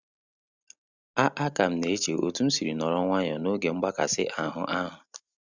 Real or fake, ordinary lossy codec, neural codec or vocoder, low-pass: real; Opus, 64 kbps; none; 7.2 kHz